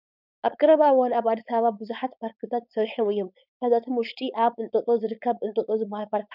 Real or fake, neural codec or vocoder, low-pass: fake; codec, 16 kHz, 4.8 kbps, FACodec; 5.4 kHz